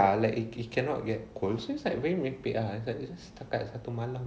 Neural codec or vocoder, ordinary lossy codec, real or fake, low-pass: none; none; real; none